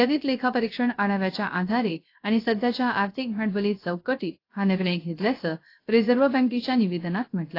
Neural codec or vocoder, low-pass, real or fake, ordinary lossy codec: codec, 16 kHz, 0.3 kbps, FocalCodec; 5.4 kHz; fake; AAC, 32 kbps